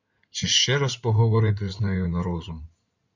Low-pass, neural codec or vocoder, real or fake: 7.2 kHz; codec, 16 kHz in and 24 kHz out, 2.2 kbps, FireRedTTS-2 codec; fake